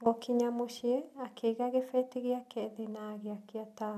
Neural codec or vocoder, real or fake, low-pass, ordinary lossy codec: none; real; 14.4 kHz; none